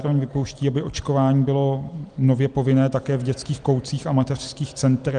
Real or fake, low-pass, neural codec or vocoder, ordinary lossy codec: real; 9.9 kHz; none; Opus, 24 kbps